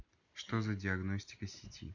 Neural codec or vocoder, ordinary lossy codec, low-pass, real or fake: none; none; 7.2 kHz; real